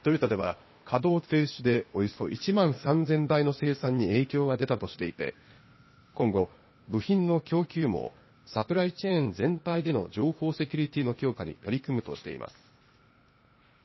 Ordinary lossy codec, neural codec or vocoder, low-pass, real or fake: MP3, 24 kbps; codec, 16 kHz, 0.8 kbps, ZipCodec; 7.2 kHz; fake